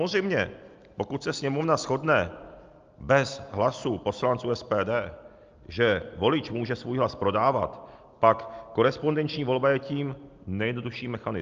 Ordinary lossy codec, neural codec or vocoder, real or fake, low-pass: Opus, 24 kbps; none; real; 7.2 kHz